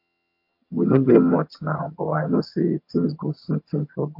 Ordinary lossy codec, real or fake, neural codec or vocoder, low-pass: MP3, 32 kbps; fake; vocoder, 22.05 kHz, 80 mel bands, HiFi-GAN; 5.4 kHz